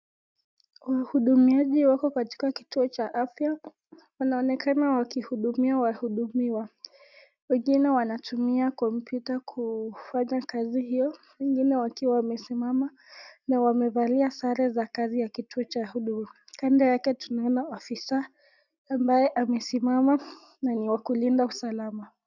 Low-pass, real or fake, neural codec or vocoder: 7.2 kHz; real; none